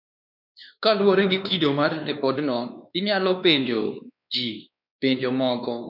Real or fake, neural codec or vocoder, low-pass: fake; codec, 16 kHz, 2 kbps, X-Codec, WavLM features, trained on Multilingual LibriSpeech; 5.4 kHz